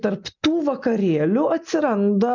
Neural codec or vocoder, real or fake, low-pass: none; real; 7.2 kHz